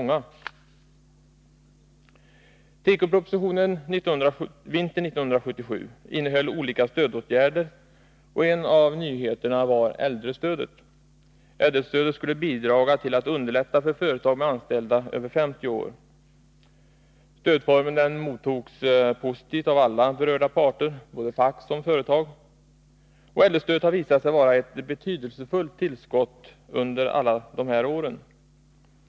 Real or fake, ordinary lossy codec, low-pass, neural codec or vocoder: real; none; none; none